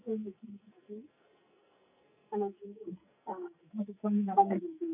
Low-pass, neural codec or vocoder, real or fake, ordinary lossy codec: 3.6 kHz; codec, 44.1 kHz, 2.6 kbps, SNAC; fake; none